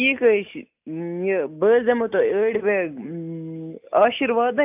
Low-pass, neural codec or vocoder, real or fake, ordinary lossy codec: 3.6 kHz; none; real; AAC, 32 kbps